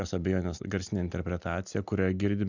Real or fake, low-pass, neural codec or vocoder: real; 7.2 kHz; none